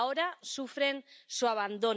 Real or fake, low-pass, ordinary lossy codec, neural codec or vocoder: real; none; none; none